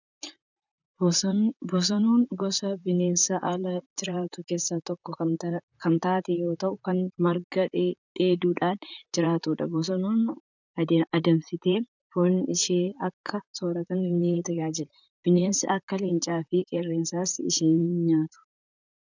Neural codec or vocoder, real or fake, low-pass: vocoder, 44.1 kHz, 128 mel bands, Pupu-Vocoder; fake; 7.2 kHz